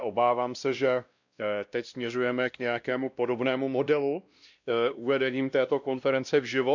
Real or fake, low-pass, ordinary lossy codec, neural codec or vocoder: fake; 7.2 kHz; none; codec, 16 kHz, 1 kbps, X-Codec, WavLM features, trained on Multilingual LibriSpeech